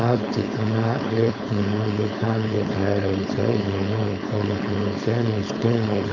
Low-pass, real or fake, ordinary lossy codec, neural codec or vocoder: 7.2 kHz; fake; none; codec, 16 kHz, 4.8 kbps, FACodec